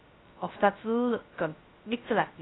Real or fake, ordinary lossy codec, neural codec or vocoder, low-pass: fake; AAC, 16 kbps; codec, 16 kHz, 0.2 kbps, FocalCodec; 7.2 kHz